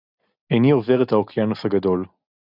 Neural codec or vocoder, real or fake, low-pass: none; real; 5.4 kHz